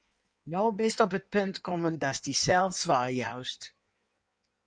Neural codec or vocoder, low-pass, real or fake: codec, 16 kHz in and 24 kHz out, 1.1 kbps, FireRedTTS-2 codec; 9.9 kHz; fake